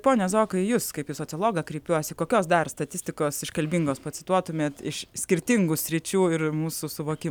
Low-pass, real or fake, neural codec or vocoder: 19.8 kHz; real; none